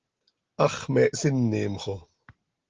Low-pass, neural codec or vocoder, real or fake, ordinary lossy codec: 7.2 kHz; none; real; Opus, 16 kbps